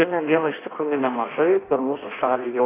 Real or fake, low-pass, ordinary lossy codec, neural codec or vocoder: fake; 3.6 kHz; AAC, 16 kbps; codec, 16 kHz in and 24 kHz out, 0.6 kbps, FireRedTTS-2 codec